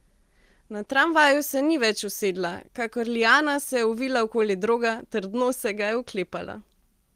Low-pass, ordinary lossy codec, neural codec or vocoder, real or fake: 14.4 kHz; Opus, 24 kbps; none; real